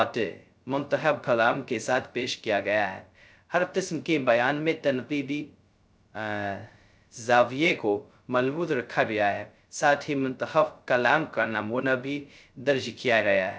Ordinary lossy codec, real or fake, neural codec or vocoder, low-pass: none; fake; codec, 16 kHz, 0.2 kbps, FocalCodec; none